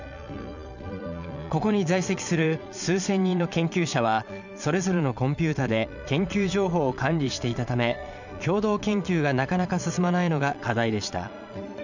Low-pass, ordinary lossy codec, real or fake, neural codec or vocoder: 7.2 kHz; none; fake; vocoder, 22.05 kHz, 80 mel bands, Vocos